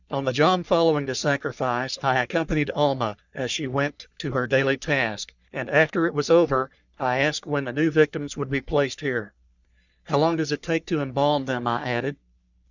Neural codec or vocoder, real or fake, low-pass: codec, 44.1 kHz, 3.4 kbps, Pupu-Codec; fake; 7.2 kHz